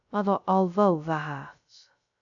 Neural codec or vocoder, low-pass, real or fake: codec, 16 kHz, 0.2 kbps, FocalCodec; 7.2 kHz; fake